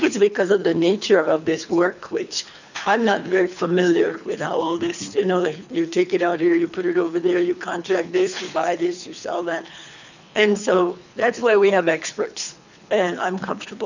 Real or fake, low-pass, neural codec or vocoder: fake; 7.2 kHz; codec, 24 kHz, 3 kbps, HILCodec